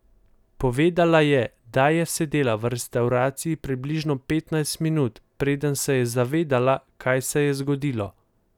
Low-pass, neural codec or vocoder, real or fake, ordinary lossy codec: 19.8 kHz; none; real; none